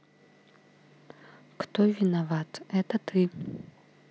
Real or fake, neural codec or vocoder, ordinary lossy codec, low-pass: real; none; none; none